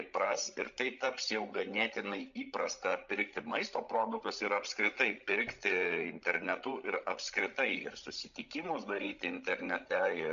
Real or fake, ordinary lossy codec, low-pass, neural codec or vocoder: fake; MP3, 64 kbps; 7.2 kHz; codec, 16 kHz, 16 kbps, FreqCodec, larger model